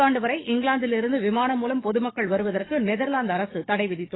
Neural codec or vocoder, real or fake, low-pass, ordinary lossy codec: codec, 44.1 kHz, 7.8 kbps, DAC; fake; 7.2 kHz; AAC, 16 kbps